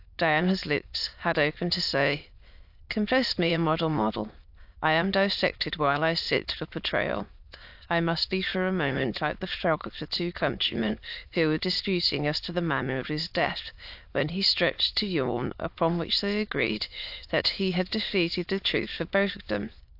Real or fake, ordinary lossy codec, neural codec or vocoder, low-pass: fake; AAC, 48 kbps; autoencoder, 22.05 kHz, a latent of 192 numbers a frame, VITS, trained on many speakers; 5.4 kHz